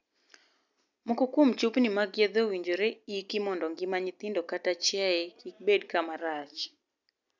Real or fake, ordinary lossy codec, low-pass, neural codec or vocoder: real; none; 7.2 kHz; none